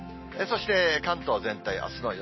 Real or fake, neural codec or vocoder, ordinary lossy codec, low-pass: real; none; MP3, 24 kbps; 7.2 kHz